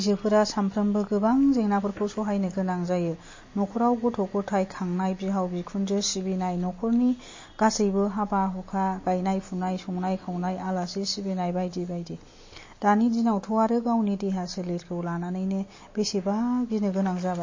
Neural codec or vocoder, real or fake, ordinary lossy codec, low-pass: none; real; MP3, 32 kbps; 7.2 kHz